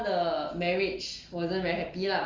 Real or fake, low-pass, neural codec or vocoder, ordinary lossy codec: real; 7.2 kHz; none; Opus, 32 kbps